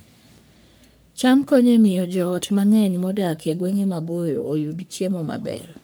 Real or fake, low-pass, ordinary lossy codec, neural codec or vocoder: fake; none; none; codec, 44.1 kHz, 3.4 kbps, Pupu-Codec